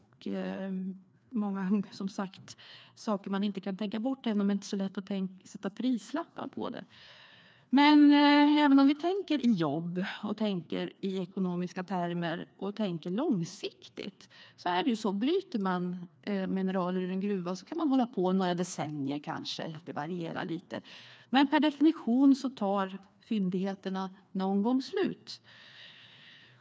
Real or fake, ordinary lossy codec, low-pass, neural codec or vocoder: fake; none; none; codec, 16 kHz, 2 kbps, FreqCodec, larger model